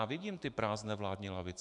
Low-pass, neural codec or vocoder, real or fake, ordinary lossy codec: 10.8 kHz; autoencoder, 48 kHz, 128 numbers a frame, DAC-VAE, trained on Japanese speech; fake; MP3, 96 kbps